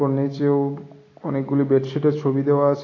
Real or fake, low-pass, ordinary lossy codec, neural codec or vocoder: real; 7.2 kHz; AAC, 32 kbps; none